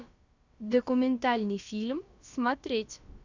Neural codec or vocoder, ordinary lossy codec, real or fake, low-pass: codec, 16 kHz, about 1 kbps, DyCAST, with the encoder's durations; Opus, 64 kbps; fake; 7.2 kHz